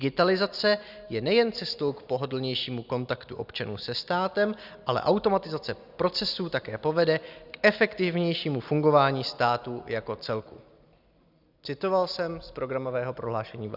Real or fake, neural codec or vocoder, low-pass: real; none; 5.4 kHz